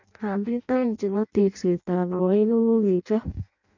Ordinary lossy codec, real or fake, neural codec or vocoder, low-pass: none; fake; codec, 16 kHz in and 24 kHz out, 0.6 kbps, FireRedTTS-2 codec; 7.2 kHz